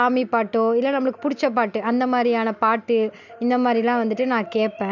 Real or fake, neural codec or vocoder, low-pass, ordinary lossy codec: real; none; 7.2 kHz; none